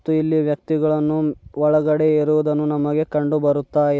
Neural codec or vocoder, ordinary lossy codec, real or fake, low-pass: none; none; real; none